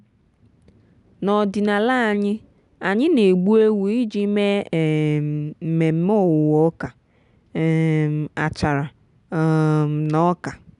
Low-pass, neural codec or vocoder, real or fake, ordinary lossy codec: 10.8 kHz; none; real; none